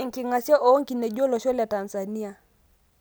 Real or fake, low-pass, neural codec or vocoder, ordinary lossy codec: real; none; none; none